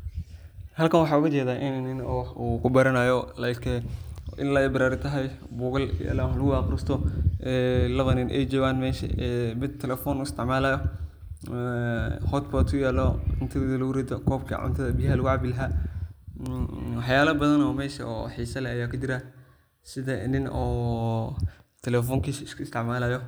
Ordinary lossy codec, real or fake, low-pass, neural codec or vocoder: none; real; none; none